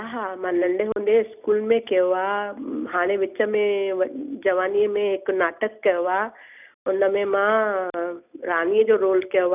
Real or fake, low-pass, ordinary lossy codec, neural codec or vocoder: real; 3.6 kHz; none; none